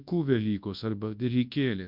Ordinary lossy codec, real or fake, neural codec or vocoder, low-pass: MP3, 48 kbps; fake; codec, 24 kHz, 0.9 kbps, WavTokenizer, large speech release; 5.4 kHz